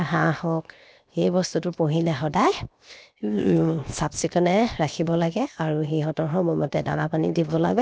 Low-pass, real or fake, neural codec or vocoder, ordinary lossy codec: none; fake; codec, 16 kHz, 0.7 kbps, FocalCodec; none